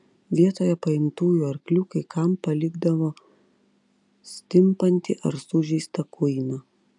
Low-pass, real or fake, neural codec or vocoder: 10.8 kHz; real; none